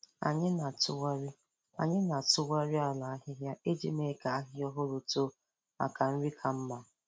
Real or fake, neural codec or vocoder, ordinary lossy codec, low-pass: real; none; none; none